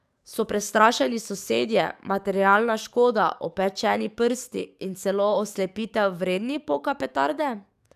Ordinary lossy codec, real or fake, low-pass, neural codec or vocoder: none; fake; 14.4 kHz; codec, 44.1 kHz, 7.8 kbps, DAC